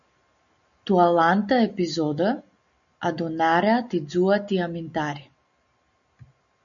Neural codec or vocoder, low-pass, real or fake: none; 7.2 kHz; real